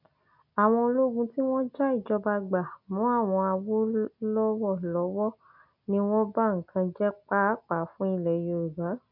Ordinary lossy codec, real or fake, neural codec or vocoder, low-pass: none; real; none; 5.4 kHz